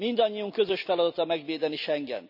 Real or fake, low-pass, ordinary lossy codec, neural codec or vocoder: real; 5.4 kHz; none; none